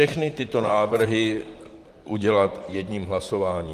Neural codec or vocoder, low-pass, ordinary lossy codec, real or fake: vocoder, 44.1 kHz, 128 mel bands, Pupu-Vocoder; 14.4 kHz; Opus, 32 kbps; fake